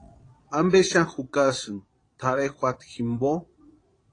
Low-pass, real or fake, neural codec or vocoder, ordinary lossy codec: 9.9 kHz; fake; vocoder, 22.05 kHz, 80 mel bands, Vocos; AAC, 32 kbps